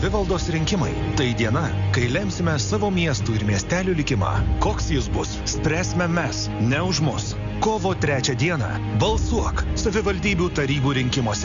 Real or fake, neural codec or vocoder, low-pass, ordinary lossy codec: real; none; 7.2 kHz; Opus, 64 kbps